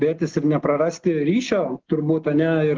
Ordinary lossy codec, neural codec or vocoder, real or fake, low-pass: Opus, 16 kbps; none; real; 7.2 kHz